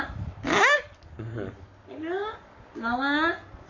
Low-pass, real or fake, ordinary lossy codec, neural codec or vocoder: 7.2 kHz; fake; none; codec, 44.1 kHz, 7.8 kbps, Pupu-Codec